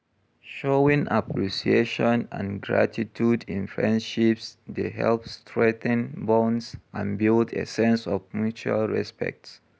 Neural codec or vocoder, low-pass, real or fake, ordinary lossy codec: none; none; real; none